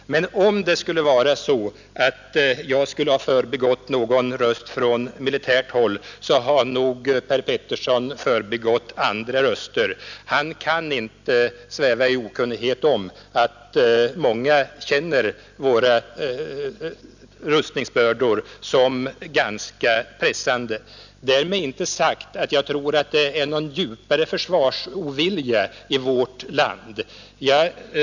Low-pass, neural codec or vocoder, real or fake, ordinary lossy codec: 7.2 kHz; none; real; none